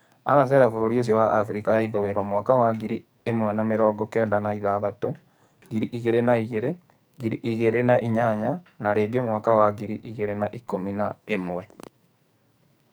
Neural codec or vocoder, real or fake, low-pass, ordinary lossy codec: codec, 44.1 kHz, 2.6 kbps, SNAC; fake; none; none